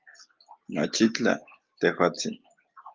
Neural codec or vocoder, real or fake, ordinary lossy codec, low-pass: none; real; Opus, 24 kbps; 7.2 kHz